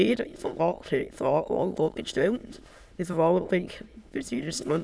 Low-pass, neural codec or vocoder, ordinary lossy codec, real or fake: none; autoencoder, 22.05 kHz, a latent of 192 numbers a frame, VITS, trained on many speakers; none; fake